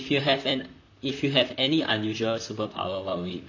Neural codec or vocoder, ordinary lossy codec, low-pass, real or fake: vocoder, 44.1 kHz, 128 mel bands, Pupu-Vocoder; none; 7.2 kHz; fake